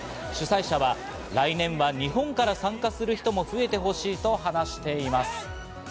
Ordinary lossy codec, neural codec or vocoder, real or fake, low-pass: none; none; real; none